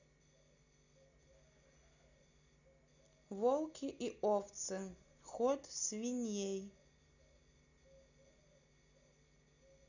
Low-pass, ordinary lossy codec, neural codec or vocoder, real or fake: 7.2 kHz; none; none; real